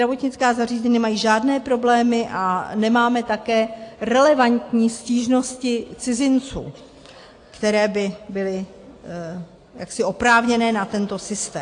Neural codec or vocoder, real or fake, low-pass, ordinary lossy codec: none; real; 9.9 kHz; AAC, 48 kbps